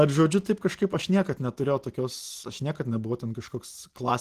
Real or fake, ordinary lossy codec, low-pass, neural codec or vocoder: real; Opus, 16 kbps; 14.4 kHz; none